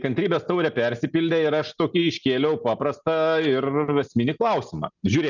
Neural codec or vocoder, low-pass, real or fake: none; 7.2 kHz; real